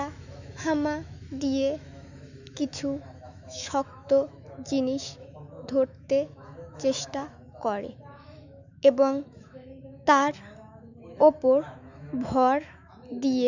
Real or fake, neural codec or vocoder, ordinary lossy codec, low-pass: fake; autoencoder, 48 kHz, 128 numbers a frame, DAC-VAE, trained on Japanese speech; none; 7.2 kHz